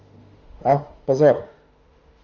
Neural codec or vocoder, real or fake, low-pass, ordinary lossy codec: autoencoder, 48 kHz, 32 numbers a frame, DAC-VAE, trained on Japanese speech; fake; 7.2 kHz; Opus, 24 kbps